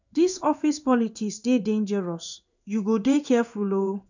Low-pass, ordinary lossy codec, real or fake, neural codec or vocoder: 7.2 kHz; none; fake; codec, 16 kHz in and 24 kHz out, 1 kbps, XY-Tokenizer